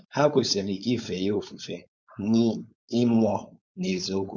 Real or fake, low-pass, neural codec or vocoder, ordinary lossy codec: fake; none; codec, 16 kHz, 4.8 kbps, FACodec; none